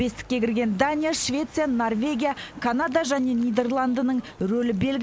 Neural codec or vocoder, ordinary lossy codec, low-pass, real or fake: none; none; none; real